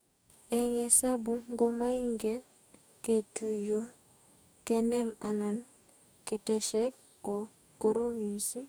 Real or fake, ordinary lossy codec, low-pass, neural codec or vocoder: fake; none; none; codec, 44.1 kHz, 2.6 kbps, DAC